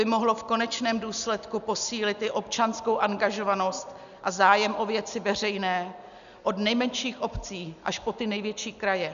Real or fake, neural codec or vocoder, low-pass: real; none; 7.2 kHz